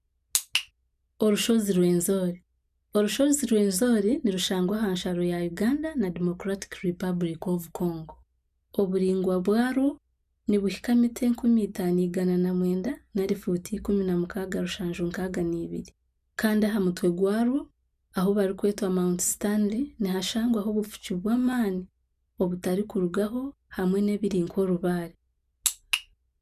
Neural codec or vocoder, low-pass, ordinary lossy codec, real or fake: none; 14.4 kHz; none; real